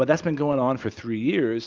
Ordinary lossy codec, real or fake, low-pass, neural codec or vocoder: Opus, 32 kbps; real; 7.2 kHz; none